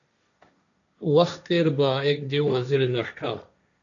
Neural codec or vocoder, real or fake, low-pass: codec, 16 kHz, 1.1 kbps, Voila-Tokenizer; fake; 7.2 kHz